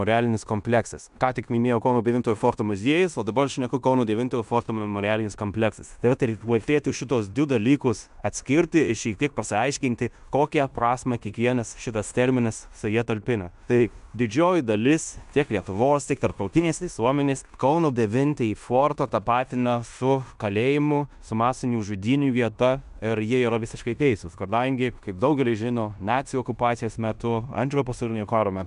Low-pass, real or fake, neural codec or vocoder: 10.8 kHz; fake; codec, 16 kHz in and 24 kHz out, 0.9 kbps, LongCat-Audio-Codec, fine tuned four codebook decoder